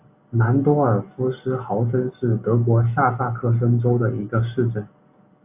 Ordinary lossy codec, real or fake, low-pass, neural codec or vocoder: AAC, 32 kbps; real; 3.6 kHz; none